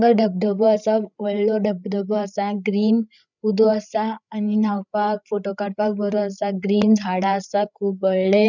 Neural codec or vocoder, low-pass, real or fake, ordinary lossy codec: codec, 16 kHz, 8 kbps, FreqCodec, larger model; 7.2 kHz; fake; none